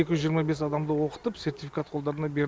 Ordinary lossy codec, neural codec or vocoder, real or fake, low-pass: none; none; real; none